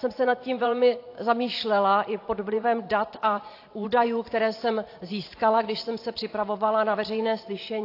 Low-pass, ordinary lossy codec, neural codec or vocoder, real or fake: 5.4 kHz; AAC, 32 kbps; vocoder, 44.1 kHz, 128 mel bands every 512 samples, BigVGAN v2; fake